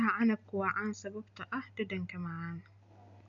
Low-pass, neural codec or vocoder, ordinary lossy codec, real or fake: 7.2 kHz; none; none; real